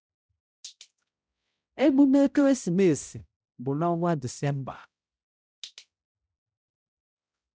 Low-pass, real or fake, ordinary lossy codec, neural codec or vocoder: none; fake; none; codec, 16 kHz, 0.5 kbps, X-Codec, HuBERT features, trained on balanced general audio